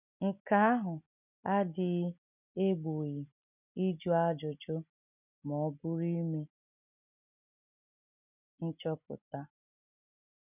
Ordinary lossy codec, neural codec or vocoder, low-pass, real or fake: none; none; 3.6 kHz; real